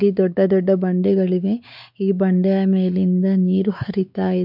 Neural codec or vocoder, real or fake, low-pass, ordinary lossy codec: codec, 16 kHz, 4 kbps, X-Codec, WavLM features, trained on Multilingual LibriSpeech; fake; 5.4 kHz; none